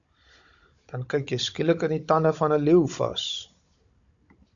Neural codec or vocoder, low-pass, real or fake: codec, 16 kHz, 16 kbps, FunCodec, trained on Chinese and English, 50 frames a second; 7.2 kHz; fake